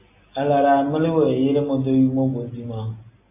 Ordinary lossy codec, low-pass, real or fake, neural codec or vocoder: AAC, 24 kbps; 3.6 kHz; real; none